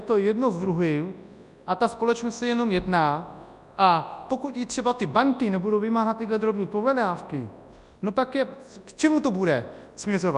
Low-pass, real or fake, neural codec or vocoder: 10.8 kHz; fake; codec, 24 kHz, 0.9 kbps, WavTokenizer, large speech release